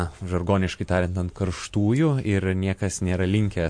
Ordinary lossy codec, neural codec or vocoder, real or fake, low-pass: MP3, 48 kbps; none; real; 9.9 kHz